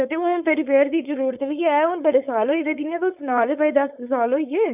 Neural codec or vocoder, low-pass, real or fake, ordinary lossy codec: codec, 16 kHz, 4.8 kbps, FACodec; 3.6 kHz; fake; none